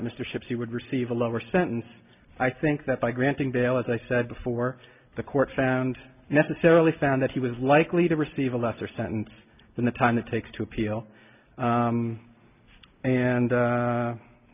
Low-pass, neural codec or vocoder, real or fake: 3.6 kHz; none; real